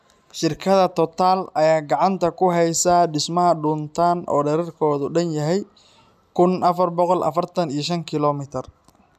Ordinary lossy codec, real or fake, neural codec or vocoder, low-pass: none; real; none; 14.4 kHz